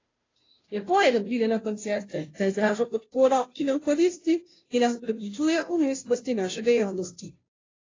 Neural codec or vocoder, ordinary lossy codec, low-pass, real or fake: codec, 16 kHz, 0.5 kbps, FunCodec, trained on Chinese and English, 25 frames a second; AAC, 32 kbps; 7.2 kHz; fake